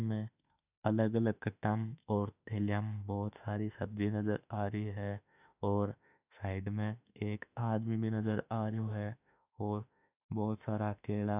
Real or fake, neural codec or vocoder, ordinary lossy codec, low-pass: fake; autoencoder, 48 kHz, 32 numbers a frame, DAC-VAE, trained on Japanese speech; none; 3.6 kHz